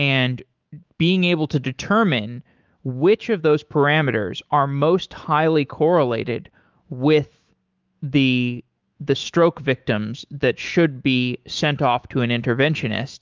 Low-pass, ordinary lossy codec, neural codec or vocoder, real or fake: 7.2 kHz; Opus, 32 kbps; autoencoder, 48 kHz, 128 numbers a frame, DAC-VAE, trained on Japanese speech; fake